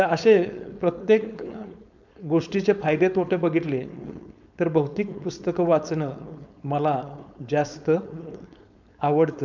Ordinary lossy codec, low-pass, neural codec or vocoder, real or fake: none; 7.2 kHz; codec, 16 kHz, 4.8 kbps, FACodec; fake